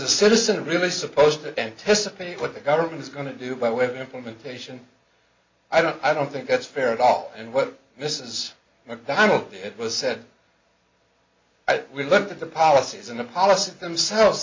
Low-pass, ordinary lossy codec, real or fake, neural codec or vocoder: 7.2 kHz; MP3, 64 kbps; real; none